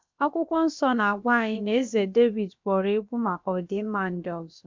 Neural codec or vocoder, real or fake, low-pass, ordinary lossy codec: codec, 16 kHz, about 1 kbps, DyCAST, with the encoder's durations; fake; 7.2 kHz; MP3, 64 kbps